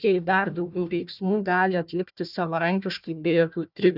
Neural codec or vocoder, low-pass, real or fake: codec, 16 kHz, 1 kbps, FunCodec, trained on Chinese and English, 50 frames a second; 5.4 kHz; fake